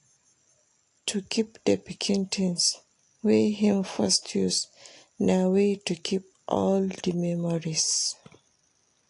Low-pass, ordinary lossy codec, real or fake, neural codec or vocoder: 10.8 kHz; AAC, 48 kbps; real; none